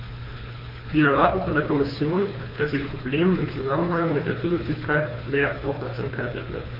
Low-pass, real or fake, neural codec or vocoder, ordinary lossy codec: 5.4 kHz; fake; codec, 24 kHz, 3 kbps, HILCodec; MP3, 32 kbps